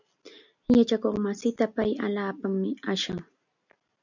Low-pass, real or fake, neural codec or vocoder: 7.2 kHz; real; none